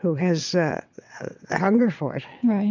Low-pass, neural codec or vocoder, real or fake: 7.2 kHz; vocoder, 22.05 kHz, 80 mel bands, WaveNeXt; fake